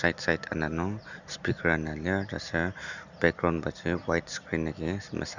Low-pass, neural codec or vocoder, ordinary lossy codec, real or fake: 7.2 kHz; none; none; real